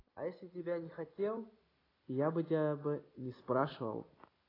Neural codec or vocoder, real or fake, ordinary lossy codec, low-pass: none; real; AAC, 24 kbps; 5.4 kHz